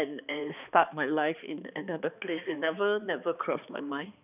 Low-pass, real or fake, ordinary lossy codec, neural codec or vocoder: 3.6 kHz; fake; none; codec, 16 kHz, 2 kbps, X-Codec, HuBERT features, trained on balanced general audio